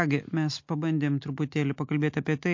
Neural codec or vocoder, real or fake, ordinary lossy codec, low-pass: none; real; MP3, 48 kbps; 7.2 kHz